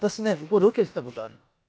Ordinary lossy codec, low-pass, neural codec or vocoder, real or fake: none; none; codec, 16 kHz, about 1 kbps, DyCAST, with the encoder's durations; fake